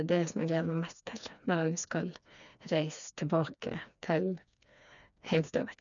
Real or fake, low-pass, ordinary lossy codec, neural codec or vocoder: fake; 7.2 kHz; none; codec, 16 kHz, 2 kbps, FreqCodec, smaller model